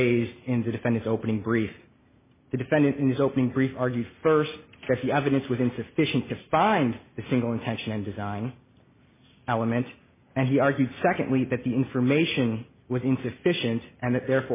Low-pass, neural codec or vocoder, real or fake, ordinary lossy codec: 3.6 kHz; none; real; MP3, 16 kbps